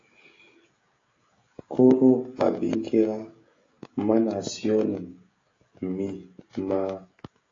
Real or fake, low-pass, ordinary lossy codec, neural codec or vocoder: fake; 7.2 kHz; AAC, 32 kbps; codec, 16 kHz, 16 kbps, FreqCodec, smaller model